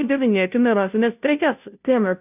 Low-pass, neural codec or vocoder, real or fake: 3.6 kHz; codec, 16 kHz, 0.5 kbps, FunCodec, trained on Chinese and English, 25 frames a second; fake